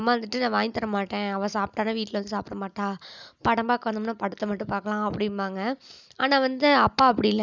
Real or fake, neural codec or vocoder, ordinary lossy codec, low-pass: real; none; none; 7.2 kHz